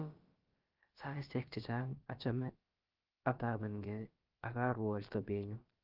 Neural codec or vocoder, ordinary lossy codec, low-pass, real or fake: codec, 16 kHz, about 1 kbps, DyCAST, with the encoder's durations; Opus, 32 kbps; 5.4 kHz; fake